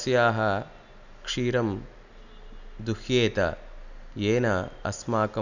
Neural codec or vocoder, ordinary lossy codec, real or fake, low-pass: none; none; real; 7.2 kHz